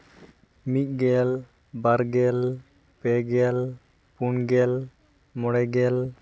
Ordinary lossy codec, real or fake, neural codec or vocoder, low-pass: none; real; none; none